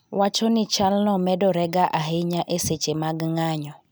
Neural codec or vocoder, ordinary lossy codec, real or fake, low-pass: none; none; real; none